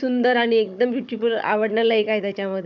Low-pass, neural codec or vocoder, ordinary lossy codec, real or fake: 7.2 kHz; codec, 16 kHz, 16 kbps, FunCodec, trained on Chinese and English, 50 frames a second; none; fake